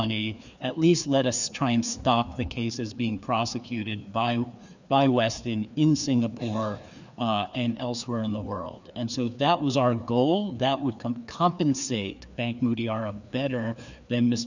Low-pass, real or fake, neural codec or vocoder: 7.2 kHz; fake; codec, 16 kHz, 2 kbps, FreqCodec, larger model